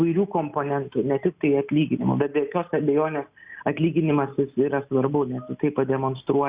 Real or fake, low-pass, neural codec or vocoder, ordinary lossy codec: real; 3.6 kHz; none; Opus, 64 kbps